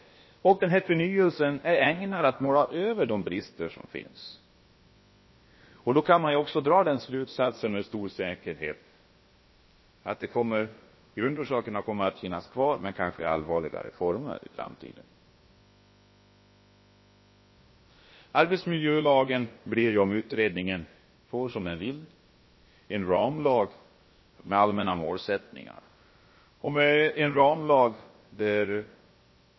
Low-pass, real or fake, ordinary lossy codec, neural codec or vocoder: 7.2 kHz; fake; MP3, 24 kbps; codec, 16 kHz, about 1 kbps, DyCAST, with the encoder's durations